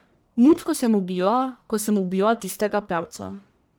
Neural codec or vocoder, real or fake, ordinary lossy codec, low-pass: codec, 44.1 kHz, 1.7 kbps, Pupu-Codec; fake; none; none